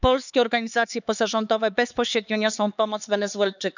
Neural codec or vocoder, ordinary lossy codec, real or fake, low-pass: codec, 16 kHz, 4 kbps, X-Codec, HuBERT features, trained on LibriSpeech; none; fake; 7.2 kHz